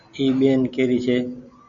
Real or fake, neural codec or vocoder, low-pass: real; none; 7.2 kHz